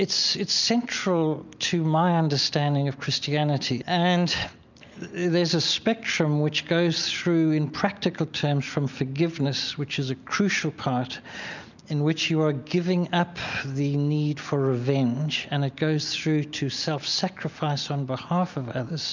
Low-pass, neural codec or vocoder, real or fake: 7.2 kHz; none; real